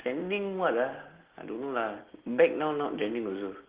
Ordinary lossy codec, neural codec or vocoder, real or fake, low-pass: Opus, 16 kbps; none; real; 3.6 kHz